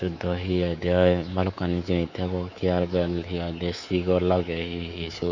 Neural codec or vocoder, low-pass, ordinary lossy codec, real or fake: codec, 24 kHz, 3.1 kbps, DualCodec; 7.2 kHz; Opus, 64 kbps; fake